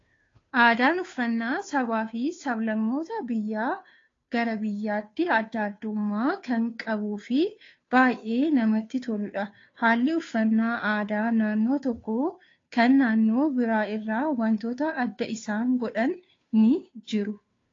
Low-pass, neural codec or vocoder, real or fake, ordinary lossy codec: 7.2 kHz; codec, 16 kHz, 2 kbps, FunCodec, trained on Chinese and English, 25 frames a second; fake; AAC, 32 kbps